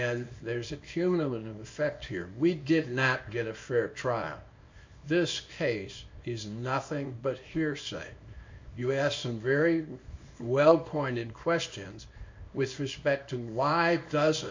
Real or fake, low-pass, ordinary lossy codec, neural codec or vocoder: fake; 7.2 kHz; MP3, 48 kbps; codec, 24 kHz, 0.9 kbps, WavTokenizer, small release